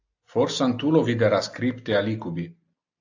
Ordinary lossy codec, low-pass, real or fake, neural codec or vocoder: AAC, 48 kbps; 7.2 kHz; real; none